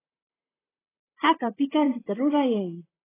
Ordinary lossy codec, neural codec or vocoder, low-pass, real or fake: AAC, 16 kbps; vocoder, 44.1 kHz, 128 mel bands, Pupu-Vocoder; 3.6 kHz; fake